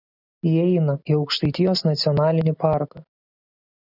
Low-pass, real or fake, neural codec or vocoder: 5.4 kHz; real; none